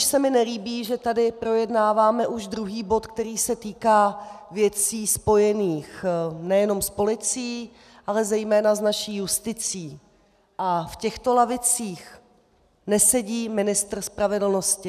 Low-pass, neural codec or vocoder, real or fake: 14.4 kHz; none; real